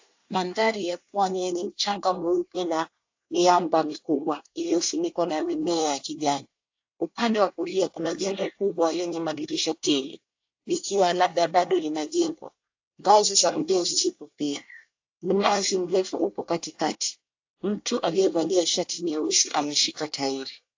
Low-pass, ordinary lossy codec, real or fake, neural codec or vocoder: 7.2 kHz; AAC, 48 kbps; fake; codec, 24 kHz, 1 kbps, SNAC